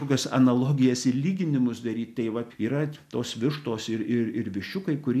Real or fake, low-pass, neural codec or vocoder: real; 14.4 kHz; none